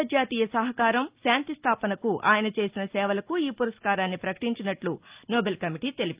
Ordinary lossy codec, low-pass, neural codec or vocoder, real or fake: Opus, 24 kbps; 3.6 kHz; none; real